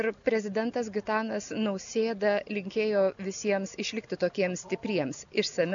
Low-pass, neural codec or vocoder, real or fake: 7.2 kHz; none; real